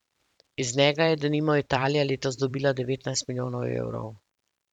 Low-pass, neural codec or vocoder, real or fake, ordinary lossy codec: 19.8 kHz; vocoder, 44.1 kHz, 128 mel bands every 512 samples, BigVGAN v2; fake; none